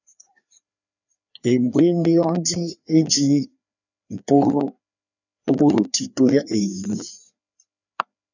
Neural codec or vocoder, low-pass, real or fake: codec, 16 kHz, 2 kbps, FreqCodec, larger model; 7.2 kHz; fake